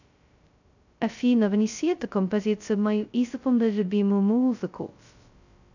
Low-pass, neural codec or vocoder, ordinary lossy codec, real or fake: 7.2 kHz; codec, 16 kHz, 0.2 kbps, FocalCodec; none; fake